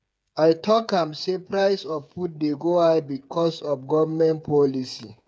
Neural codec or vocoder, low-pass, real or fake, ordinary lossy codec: codec, 16 kHz, 8 kbps, FreqCodec, smaller model; none; fake; none